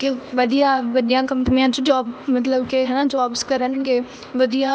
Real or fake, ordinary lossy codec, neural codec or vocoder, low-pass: fake; none; codec, 16 kHz, 0.8 kbps, ZipCodec; none